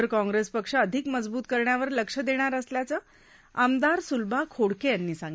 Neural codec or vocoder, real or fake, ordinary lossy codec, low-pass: none; real; none; none